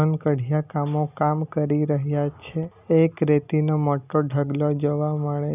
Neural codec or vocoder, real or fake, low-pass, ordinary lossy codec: none; real; 3.6 kHz; none